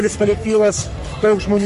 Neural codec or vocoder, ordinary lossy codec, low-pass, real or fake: codec, 44.1 kHz, 3.4 kbps, Pupu-Codec; MP3, 48 kbps; 14.4 kHz; fake